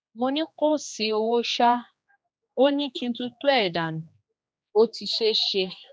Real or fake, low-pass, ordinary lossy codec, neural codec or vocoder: fake; none; none; codec, 16 kHz, 2 kbps, X-Codec, HuBERT features, trained on general audio